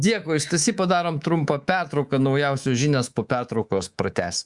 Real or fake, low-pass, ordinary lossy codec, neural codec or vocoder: fake; 10.8 kHz; Opus, 64 kbps; codec, 24 kHz, 3.1 kbps, DualCodec